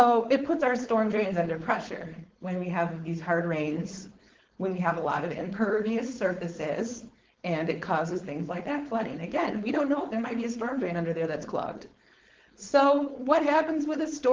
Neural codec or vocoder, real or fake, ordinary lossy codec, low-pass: codec, 16 kHz, 4.8 kbps, FACodec; fake; Opus, 16 kbps; 7.2 kHz